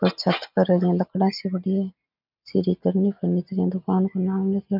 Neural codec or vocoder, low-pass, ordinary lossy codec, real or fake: none; 5.4 kHz; none; real